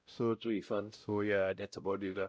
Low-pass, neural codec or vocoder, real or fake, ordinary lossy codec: none; codec, 16 kHz, 0.5 kbps, X-Codec, WavLM features, trained on Multilingual LibriSpeech; fake; none